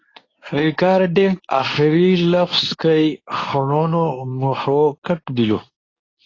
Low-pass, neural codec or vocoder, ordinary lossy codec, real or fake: 7.2 kHz; codec, 24 kHz, 0.9 kbps, WavTokenizer, medium speech release version 2; AAC, 32 kbps; fake